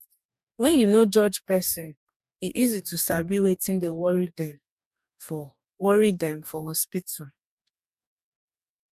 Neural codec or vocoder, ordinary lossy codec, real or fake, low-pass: codec, 44.1 kHz, 2.6 kbps, DAC; none; fake; 14.4 kHz